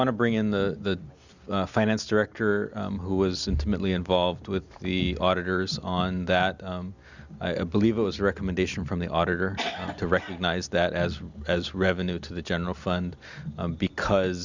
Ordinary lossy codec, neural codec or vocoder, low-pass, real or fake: Opus, 64 kbps; none; 7.2 kHz; real